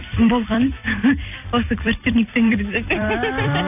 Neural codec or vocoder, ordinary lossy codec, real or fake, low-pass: vocoder, 44.1 kHz, 128 mel bands every 256 samples, BigVGAN v2; none; fake; 3.6 kHz